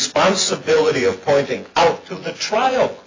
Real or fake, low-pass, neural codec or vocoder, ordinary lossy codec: fake; 7.2 kHz; vocoder, 24 kHz, 100 mel bands, Vocos; AAC, 32 kbps